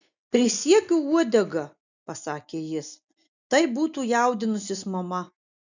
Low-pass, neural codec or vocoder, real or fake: 7.2 kHz; none; real